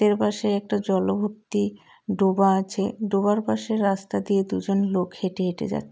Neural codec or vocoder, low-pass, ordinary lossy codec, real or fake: none; none; none; real